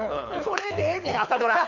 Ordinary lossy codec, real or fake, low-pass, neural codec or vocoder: none; fake; 7.2 kHz; codec, 24 kHz, 6 kbps, HILCodec